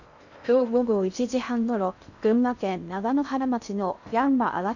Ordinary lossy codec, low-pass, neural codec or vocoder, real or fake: none; 7.2 kHz; codec, 16 kHz in and 24 kHz out, 0.6 kbps, FocalCodec, streaming, 2048 codes; fake